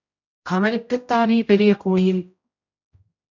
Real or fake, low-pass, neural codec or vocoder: fake; 7.2 kHz; codec, 16 kHz, 0.5 kbps, X-Codec, HuBERT features, trained on general audio